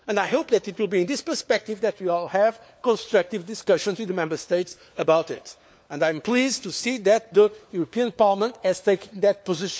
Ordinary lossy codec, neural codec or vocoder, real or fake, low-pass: none; codec, 16 kHz, 4 kbps, FunCodec, trained on LibriTTS, 50 frames a second; fake; none